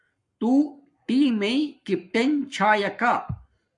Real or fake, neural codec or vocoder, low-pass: fake; codec, 44.1 kHz, 7.8 kbps, Pupu-Codec; 10.8 kHz